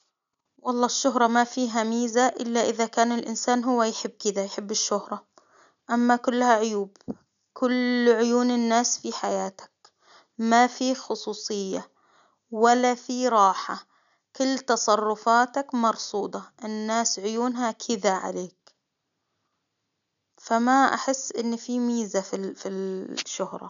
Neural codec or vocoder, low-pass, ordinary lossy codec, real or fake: none; 7.2 kHz; none; real